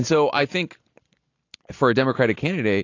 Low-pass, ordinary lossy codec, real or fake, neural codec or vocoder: 7.2 kHz; AAC, 48 kbps; real; none